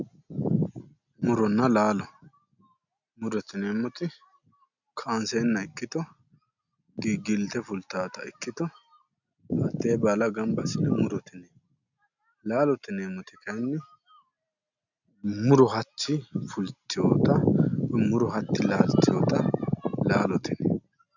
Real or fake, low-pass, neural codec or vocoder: real; 7.2 kHz; none